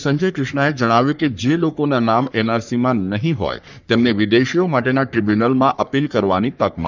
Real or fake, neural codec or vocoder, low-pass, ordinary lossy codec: fake; codec, 44.1 kHz, 3.4 kbps, Pupu-Codec; 7.2 kHz; none